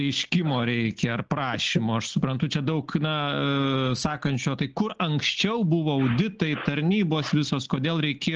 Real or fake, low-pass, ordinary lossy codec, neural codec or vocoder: real; 7.2 kHz; Opus, 16 kbps; none